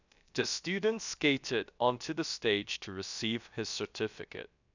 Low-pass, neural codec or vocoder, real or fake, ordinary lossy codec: 7.2 kHz; codec, 16 kHz, about 1 kbps, DyCAST, with the encoder's durations; fake; none